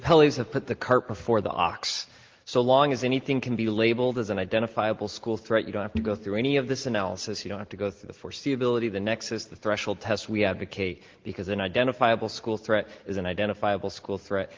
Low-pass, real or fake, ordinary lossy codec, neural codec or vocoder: 7.2 kHz; real; Opus, 16 kbps; none